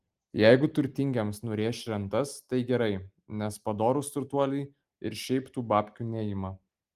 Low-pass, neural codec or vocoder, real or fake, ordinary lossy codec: 14.4 kHz; none; real; Opus, 24 kbps